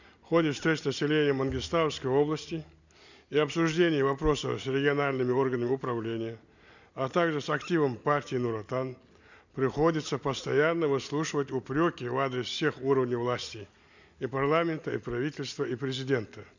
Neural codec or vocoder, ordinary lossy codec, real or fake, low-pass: none; none; real; 7.2 kHz